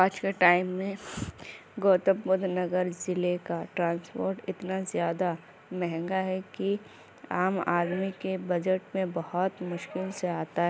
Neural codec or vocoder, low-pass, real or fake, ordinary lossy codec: none; none; real; none